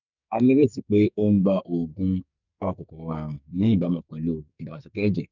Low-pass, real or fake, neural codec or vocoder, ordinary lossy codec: 7.2 kHz; fake; codec, 44.1 kHz, 2.6 kbps, SNAC; none